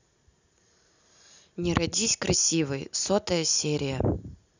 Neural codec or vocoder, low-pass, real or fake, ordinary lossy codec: none; 7.2 kHz; real; none